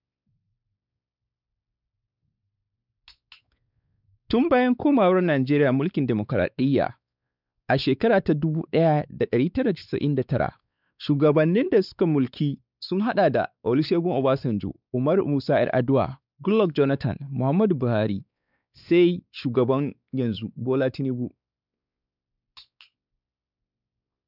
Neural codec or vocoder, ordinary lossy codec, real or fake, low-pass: codec, 16 kHz, 4 kbps, X-Codec, WavLM features, trained on Multilingual LibriSpeech; none; fake; 5.4 kHz